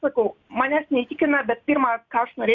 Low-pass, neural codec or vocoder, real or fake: 7.2 kHz; none; real